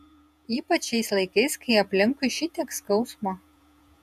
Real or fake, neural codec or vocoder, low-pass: fake; vocoder, 48 kHz, 128 mel bands, Vocos; 14.4 kHz